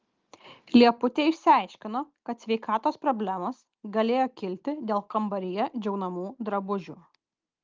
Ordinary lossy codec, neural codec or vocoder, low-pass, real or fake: Opus, 32 kbps; none; 7.2 kHz; real